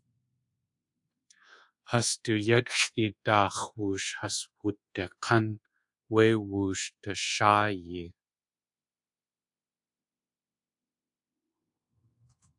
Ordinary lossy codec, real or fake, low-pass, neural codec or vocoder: AAC, 64 kbps; fake; 10.8 kHz; codec, 24 kHz, 1.2 kbps, DualCodec